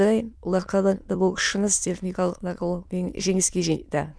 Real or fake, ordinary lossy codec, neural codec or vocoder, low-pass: fake; none; autoencoder, 22.05 kHz, a latent of 192 numbers a frame, VITS, trained on many speakers; none